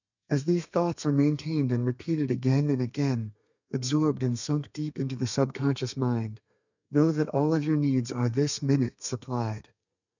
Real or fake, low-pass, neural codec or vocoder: fake; 7.2 kHz; codec, 32 kHz, 1.9 kbps, SNAC